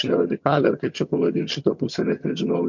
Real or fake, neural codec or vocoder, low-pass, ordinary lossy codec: fake; vocoder, 22.05 kHz, 80 mel bands, HiFi-GAN; 7.2 kHz; MP3, 48 kbps